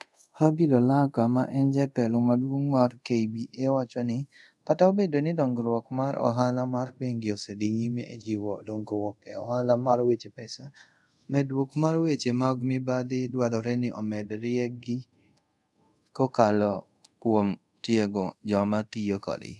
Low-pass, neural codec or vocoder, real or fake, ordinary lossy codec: none; codec, 24 kHz, 0.5 kbps, DualCodec; fake; none